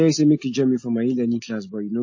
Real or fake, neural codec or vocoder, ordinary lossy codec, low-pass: real; none; MP3, 32 kbps; 7.2 kHz